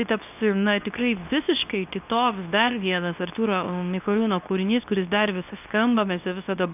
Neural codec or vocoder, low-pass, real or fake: codec, 24 kHz, 0.9 kbps, WavTokenizer, medium speech release version 2; 3.6 kHz; fake